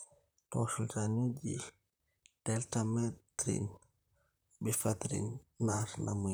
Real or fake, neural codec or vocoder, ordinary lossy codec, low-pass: fake; vocoder, 44.1 kHz, 128 mel bands, Pupu-Vocoder; none; none